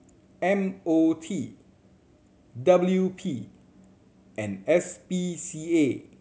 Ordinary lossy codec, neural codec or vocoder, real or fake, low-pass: none; none; real; none